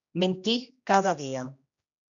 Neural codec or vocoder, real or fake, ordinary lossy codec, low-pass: codec, 16 kHz, 1 kbps, X-Codec, HuBERT features, trained on general audio; fake; MP3, 96 kbps; 7.2 kHz